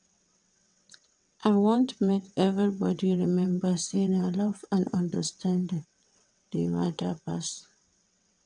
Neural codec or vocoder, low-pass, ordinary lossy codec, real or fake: vocoder, 22.05 kHz, 80 mel bands, WaveNeXt; 9.9 kHz; MP3, 96 kbps; fake